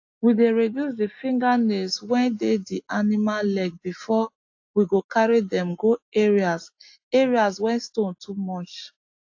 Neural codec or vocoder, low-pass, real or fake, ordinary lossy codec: none; 7.2 kHz; real; AAC, 48 kbps